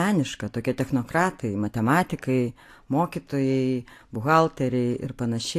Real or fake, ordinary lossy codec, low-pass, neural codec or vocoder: real; AAC, 64 kbps; 14.4 kHz; none